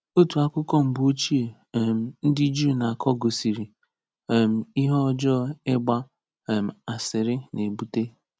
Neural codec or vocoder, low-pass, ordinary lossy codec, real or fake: none; none; none; real